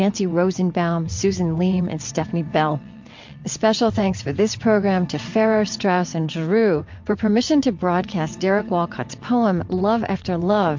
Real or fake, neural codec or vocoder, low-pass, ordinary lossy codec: fake; vocoder, 22.05 kHz, 80 mel bands, WaveNeXt; 7.2 kHz; MP3, 48 kbps